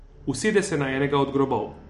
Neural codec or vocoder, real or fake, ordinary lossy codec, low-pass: none; real; MP3, 48 kbps; 14.4 kHz